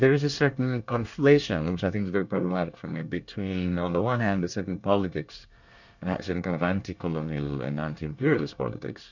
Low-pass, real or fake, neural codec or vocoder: 7.2 kHz; fake; codec, 24 kHz, 1 kbps, SNAC